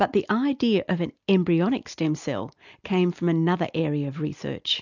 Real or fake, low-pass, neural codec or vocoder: real; 7.2 kHz; none